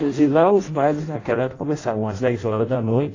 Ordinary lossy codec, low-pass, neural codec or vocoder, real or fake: AAC, 32 kbps; 7.2 kHz; codec, 16 kHz in and 24 kHz out, 0.6 kbps, FireRedTTS-2 codec; fake